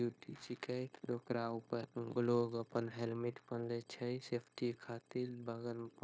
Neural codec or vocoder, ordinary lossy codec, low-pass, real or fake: codec, 16 kHz, 0.9 kbps, LongCat-Audio-Codec; none; none; fake